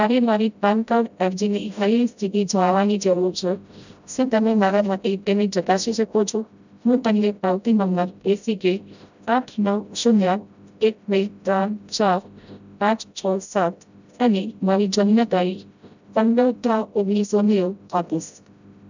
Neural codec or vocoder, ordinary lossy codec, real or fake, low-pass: codec, 16 kHz, 0.5 kbps, FreqCodec, smaller model; none; fake; 7.2 kHz